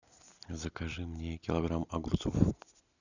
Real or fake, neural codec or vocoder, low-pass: real; none; 7.2 kHz